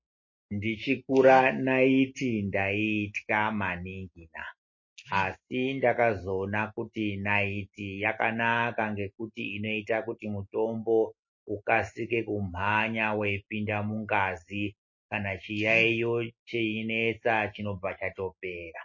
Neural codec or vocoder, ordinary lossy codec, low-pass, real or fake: none; MP3, 32 kbps; 7.2 kHz; real